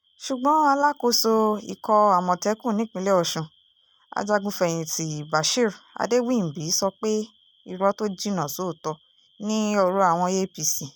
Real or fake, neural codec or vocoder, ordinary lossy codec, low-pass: real; none; none; none